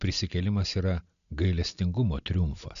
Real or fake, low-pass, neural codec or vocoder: real; 7.2 kHz; none